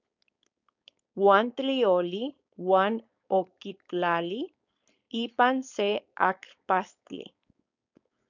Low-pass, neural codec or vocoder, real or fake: 7.2 kHz; codec, 16 kHz, 4.8 kbps, FACodec; fake